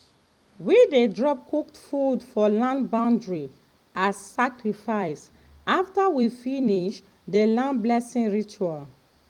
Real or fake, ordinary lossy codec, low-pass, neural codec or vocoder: fake; Opus, 32 kbps; 19.8 kHz; vocoder, 44.1 kHz, 128 mel bands every 256 samples, BigVGAN v2